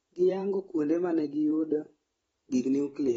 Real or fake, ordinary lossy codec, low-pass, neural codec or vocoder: fake; AAC, 24 kbps; 19.8 kHz; vocoder, 44.1 kHz, 128 mel bands every 512 samples, BigVGAN v2